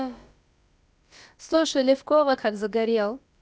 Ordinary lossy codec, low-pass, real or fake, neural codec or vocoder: none; none; fake; codec, 16 kHz, about 1 kbps, DyCAST, with the encoder's durations